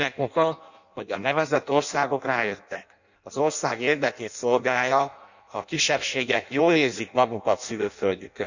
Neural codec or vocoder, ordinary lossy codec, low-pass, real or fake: codec, 16 kHz in and 24 kHz out, 0.6 kbps, FireRedTTS-2 codec; none; 7.2 kHz; fake